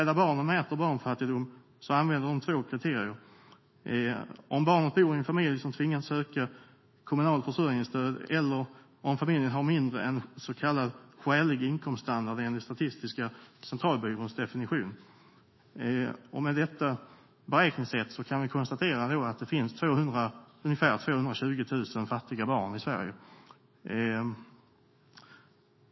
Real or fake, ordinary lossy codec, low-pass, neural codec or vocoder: fake; MP3, 24 kbps; 7.2 kHz; autoencoder, 48 kHz, 128 numbers a frame, DAC-VAE, trained on Japanese speech